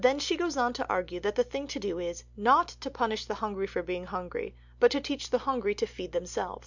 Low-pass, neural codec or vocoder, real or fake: 7.2 kHz; none; real